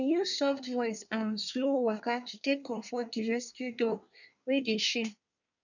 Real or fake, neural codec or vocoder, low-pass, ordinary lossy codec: fake; codec, 24 kHz, 1 kbps, SNAC; 7.2 kHz; none